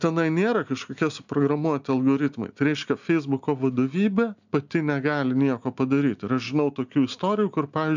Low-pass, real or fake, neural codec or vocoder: 7.2 kHz; real; none